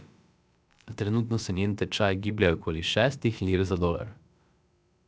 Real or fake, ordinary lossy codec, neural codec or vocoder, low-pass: fake; none; codec, 16 kHz, about 1 kbps, DyCAST, with the encoder's durations; none